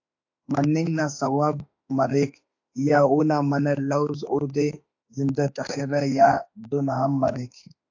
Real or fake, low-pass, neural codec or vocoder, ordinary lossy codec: fake; 7.2 kHz; autoencoder, 48 kHz, 32 numbers a frame, DAC-VAE, trained on Japanese speech; AAC, 48 kbps